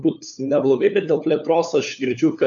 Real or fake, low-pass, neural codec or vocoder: fake; 7.2 kHz; codec, 16 kHz, 4 kbps, FunCodec, trained on LibriTTS, 50 frames a second